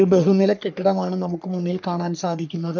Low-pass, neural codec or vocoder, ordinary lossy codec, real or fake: 7.2 kHz; codec, 44.1 kHz, 3.4 kbps, Pupu-Codec; none; fake